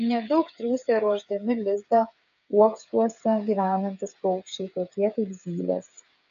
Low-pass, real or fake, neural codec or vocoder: 7.2 kHz; fake; codec, 16 kHz, 8 kbps, FreqCodec, smaller model